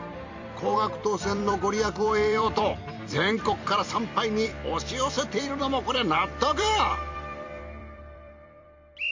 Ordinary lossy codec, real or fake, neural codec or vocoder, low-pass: MP3, 64 kbps; fake; vocoder, 44.1 kHz, 128 mel bands every 256 samples, BigVGAN v2; 7.2 kHz